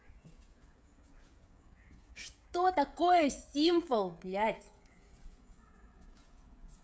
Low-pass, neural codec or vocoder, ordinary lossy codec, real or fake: none; codec, 16 kHz, 16 kbps, FreqCodec, smaller model; none; fake